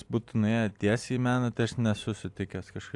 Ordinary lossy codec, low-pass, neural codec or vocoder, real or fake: AAC, 64 kbps; 10.8 kHz; none; real